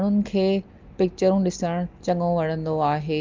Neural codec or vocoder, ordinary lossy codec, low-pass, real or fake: none; Opus, 32 kbps; 7.2 kHz; real